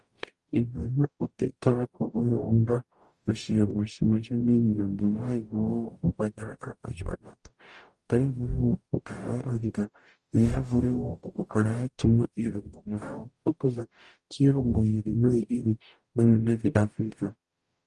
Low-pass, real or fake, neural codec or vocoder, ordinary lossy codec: 10.8 kHz; fake; codec, 44.1 kHz, 0.9 kbps, DAC; Opus, 32 kbps